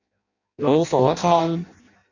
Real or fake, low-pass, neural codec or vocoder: fake; 7.2 kHz; codec, 16 kHz in and 24 kHz out, 0.6 kbps, FireRedTTS-2 codec